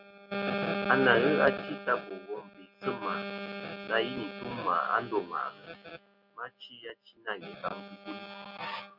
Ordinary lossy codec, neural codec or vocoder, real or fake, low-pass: Opus, 64 kbps; none; real; 5.4 kHz